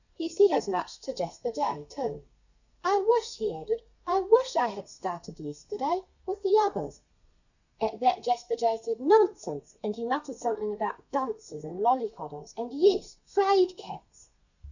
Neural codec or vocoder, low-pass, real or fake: codec, 32 kHz, 1.9 kbps, SNAC; 7.2 kHz; fake